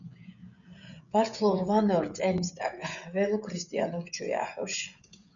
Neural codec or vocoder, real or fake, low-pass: codec, 16 kHz, 16 kbps, FreqCodec, smaller model; fake; 7.2 kHz